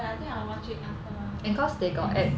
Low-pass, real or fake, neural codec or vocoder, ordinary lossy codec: none; real; none; none